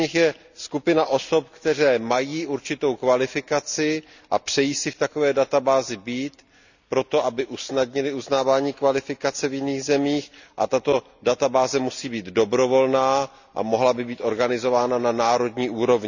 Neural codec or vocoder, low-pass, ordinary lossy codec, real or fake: none; 7.2 kHz; none; real